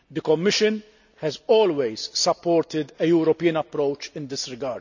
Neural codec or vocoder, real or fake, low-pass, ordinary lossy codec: none; real; 7.2 kHz; none